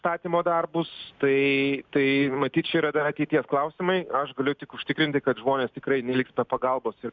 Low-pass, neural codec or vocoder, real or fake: 7.2 kHz; none; real